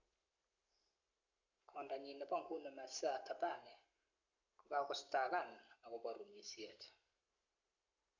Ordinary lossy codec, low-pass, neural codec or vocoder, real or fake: none; 7.2 kHz; codec, 44.1 kHz, 7.8 kbps, Pupu-Codec; fake